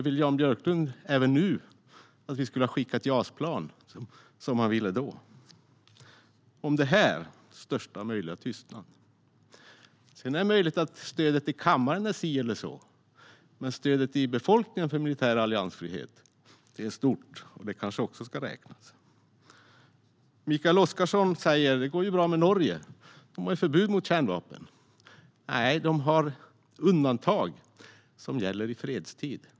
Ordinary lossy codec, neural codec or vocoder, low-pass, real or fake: none; none; none; real